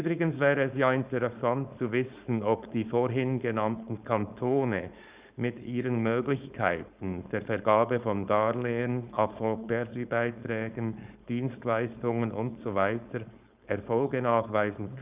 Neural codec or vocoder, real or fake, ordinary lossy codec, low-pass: codec, 16 kHz, 4.8 kbps, FACodec; fake; Opus, 64 kbps; 3.6 kHz